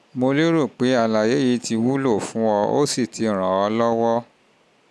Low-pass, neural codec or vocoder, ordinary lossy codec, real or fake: none; none; none; real